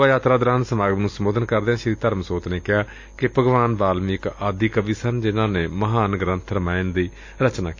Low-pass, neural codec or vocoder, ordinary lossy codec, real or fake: 7.2 kHz; none; AAC, 48 kbps; real